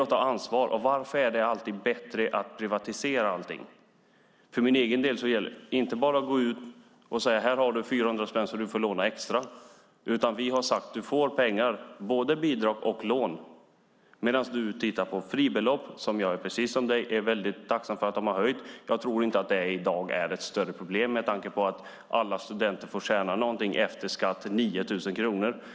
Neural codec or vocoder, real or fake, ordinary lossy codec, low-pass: none; real; none; none